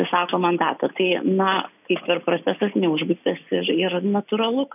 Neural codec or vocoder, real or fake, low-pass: none; real; 3.6 kHz